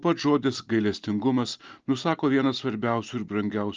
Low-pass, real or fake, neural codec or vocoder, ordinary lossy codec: 7.2 kHz; real; none; Opus, 24 kbps